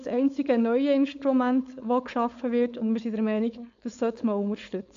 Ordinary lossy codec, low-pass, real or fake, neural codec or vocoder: AAC, 64 kbps; 7.2 kHz; fake; codec, 16 kHz, 4.8 kbps, FACodec